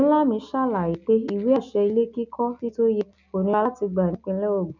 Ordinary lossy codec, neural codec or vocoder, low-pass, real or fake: none; none; 7.2 kHz; real